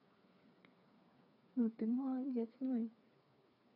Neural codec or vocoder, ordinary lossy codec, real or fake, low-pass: codec, 16 kHz, 4 kbps, FreqCodec, smaller model; none; fake; 5.4 kHz